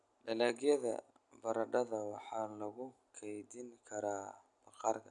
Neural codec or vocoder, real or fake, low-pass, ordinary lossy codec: none; real; 10.8 kHz; none